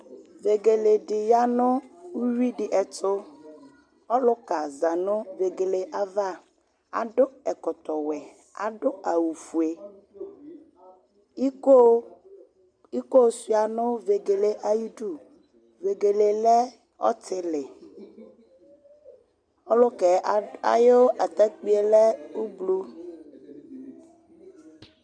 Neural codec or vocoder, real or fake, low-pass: none; real; 9.9 kHz